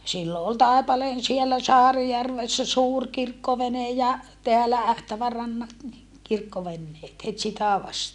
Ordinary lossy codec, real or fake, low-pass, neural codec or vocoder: none; real; 10.8 kHz; none